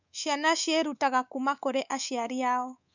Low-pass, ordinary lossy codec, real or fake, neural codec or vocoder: 7.2 kHz; none; fake; codec, 24 kHz, 3.1 kbps, DualCodec